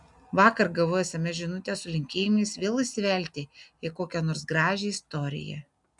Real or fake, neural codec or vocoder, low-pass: real; none; 10.8 kHz